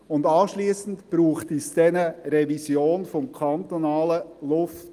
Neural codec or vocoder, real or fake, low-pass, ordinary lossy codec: none; real; 14.4 kHz; Opus, 32 kbps